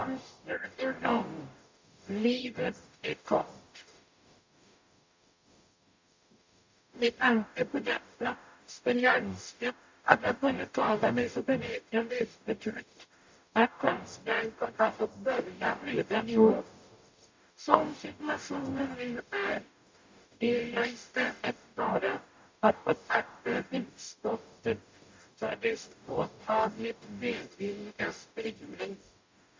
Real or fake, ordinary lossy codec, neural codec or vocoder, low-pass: fake; MP3, 64 kbps; codec, 44.1 kHz, 0.9 kbps, DAC; 7.2 kHz